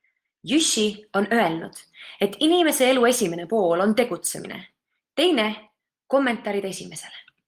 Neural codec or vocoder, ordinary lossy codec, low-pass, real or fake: none; Opus, 24 kbps; 14.4 kHz; real